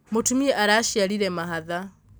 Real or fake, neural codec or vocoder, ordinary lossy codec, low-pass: real; none; none; none